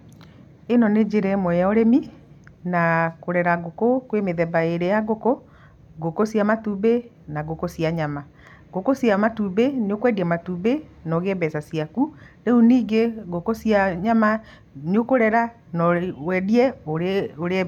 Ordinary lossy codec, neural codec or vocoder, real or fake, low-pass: none; none; real; 19.8 kHz